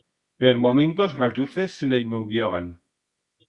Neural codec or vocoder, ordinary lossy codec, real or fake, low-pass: codec, 24 kHz, 0.9 kbps, WavTokenizer, medium music audio release; Opus, 64 kbps; fake; 10.8 kHz